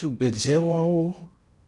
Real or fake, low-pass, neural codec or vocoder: fake; 10.8 kHz; codec, 16 kHz in and 24 kHz out, 0.8 kbps, FocalCodec, streaming, 65536 codes